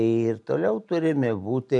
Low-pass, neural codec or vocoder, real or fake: 10.8 kHz; none; real